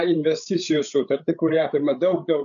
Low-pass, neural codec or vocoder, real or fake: 7.2 kHz; codec, 16 kHz, 8 kbps, FreqCodec, larger model; fake